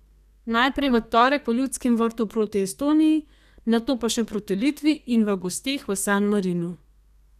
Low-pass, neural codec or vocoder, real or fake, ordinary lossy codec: 14.4 kHz; codec, 32 kHz, 1.9 kbps, SNAC; fake; none